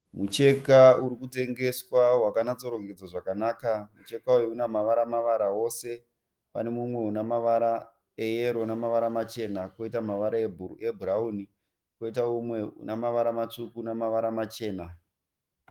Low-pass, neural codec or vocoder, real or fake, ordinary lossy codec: 19.8 kHz; autoencoder, 48 kHz, 128 numbers a frame, DAC-VAE, trained on Japanese speech; fake; Opus, 24 kbps